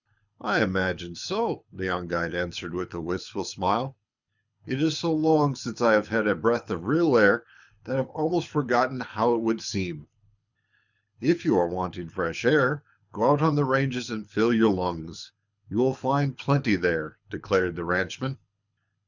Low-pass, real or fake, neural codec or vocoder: 7.2 kHz; fake; codec, 24 kHz, 6 kbps, HILCodec